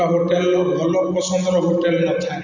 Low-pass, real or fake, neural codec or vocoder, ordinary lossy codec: 7.2 kHz; real; none; none